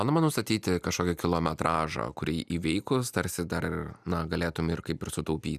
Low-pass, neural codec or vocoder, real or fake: 14.4 kHz; none; real